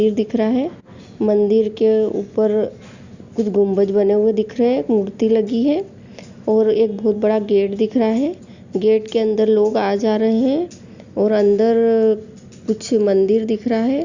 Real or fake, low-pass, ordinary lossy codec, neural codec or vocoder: real; 7.2 kHz; Opus, 64 kbps; none